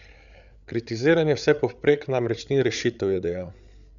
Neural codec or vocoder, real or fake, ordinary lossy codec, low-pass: codec, 16 kHz, 8 kbps, FreqCodec, larger model; fake; none; 7.2 kHz